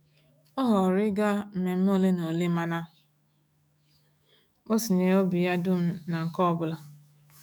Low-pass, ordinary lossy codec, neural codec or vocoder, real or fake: none; none; autoencoder, 48 kHz, 128 numbers a frame, DAC-VAE, trained on Japanese speech; fake